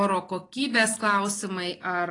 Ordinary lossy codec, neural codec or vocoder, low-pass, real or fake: AAC, 32 kbps; none; 10.8 kHz; real